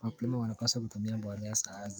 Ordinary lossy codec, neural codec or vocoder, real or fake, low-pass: none; codec, 44.1 kHz, 7.8 kbps, DAC; fake; none